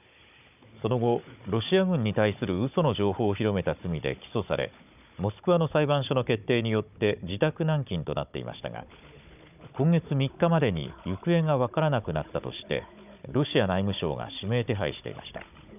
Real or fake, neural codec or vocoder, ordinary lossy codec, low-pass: fake; codec, 16 kHz, 16 kbps, FunCodec, trained on Chinese and English, 50 frames a second; none; 3.6 kHz